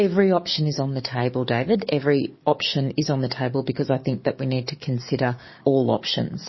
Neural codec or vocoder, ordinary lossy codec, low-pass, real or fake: codec, 44.1 kHz, 7.8 kbps, DAC; MP3, 24 kbps; 7.2 kHz; fake